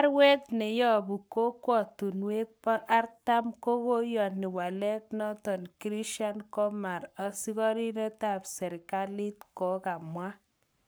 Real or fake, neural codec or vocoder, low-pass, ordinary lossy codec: fake; codec, 44.1 kHz, 7.8 kbps, Pupu-Codec; none; none